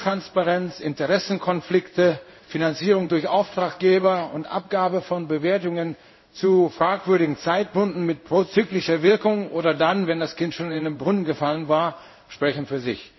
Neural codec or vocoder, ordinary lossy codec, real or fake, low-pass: codec, 16 kHz in and 24 kHz out, 1 kbps, XY-Tokenizer; MP3, 24 kbps; fake; 7.2 kHz